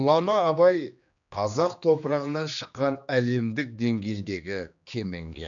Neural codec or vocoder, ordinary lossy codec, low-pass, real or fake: codec, 16 kHz, 2 kbps, X-Codec, HuBERT features, trained on general audio; none; 7.2 kHz; fake